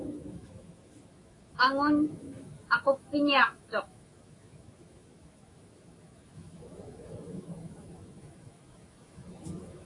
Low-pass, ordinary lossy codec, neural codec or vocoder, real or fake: 10.8 kHz; AAC, 32 kbps; autoencoder, 48 kHz, 128 numbers a frame, DAC-VAE, trained on Japanese speech; fake